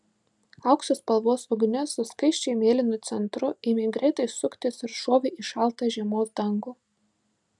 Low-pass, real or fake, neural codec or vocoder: 10.8 kHz; real; none